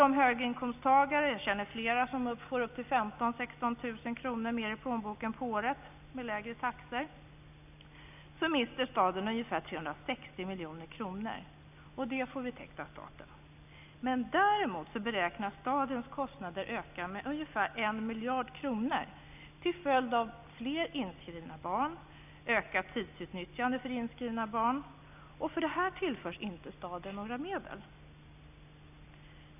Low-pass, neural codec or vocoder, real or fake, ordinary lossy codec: 3.6 kHz; none; real; none